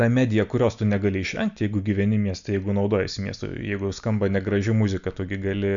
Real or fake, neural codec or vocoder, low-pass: real; none; 7.2 kHz